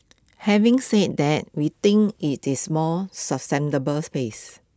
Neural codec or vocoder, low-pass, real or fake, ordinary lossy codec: none; none; real; none